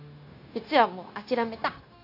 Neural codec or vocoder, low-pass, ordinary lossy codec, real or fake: codec, 16 kHz, 0.9 kbps, LongCat-Audio-Codec; 5.4 kHz; AAC, 32 kbps; fake